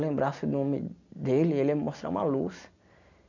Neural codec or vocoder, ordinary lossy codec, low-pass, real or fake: none; none; 7.2 kHz; real